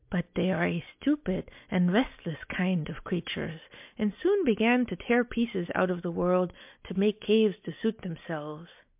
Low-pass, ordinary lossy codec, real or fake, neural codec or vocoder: 3.6 kHz; MP3, 32 kbps; real; none